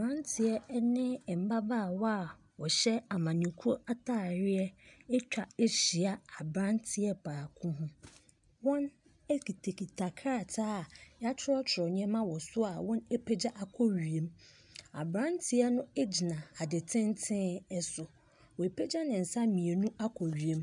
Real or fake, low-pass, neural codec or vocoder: real; 10.8 kHz; none